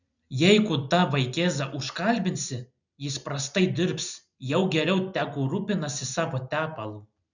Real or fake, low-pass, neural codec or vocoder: real; 7.2 kHz; none